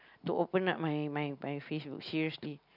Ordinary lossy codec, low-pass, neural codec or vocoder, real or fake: none; 5.4 kHz; none; real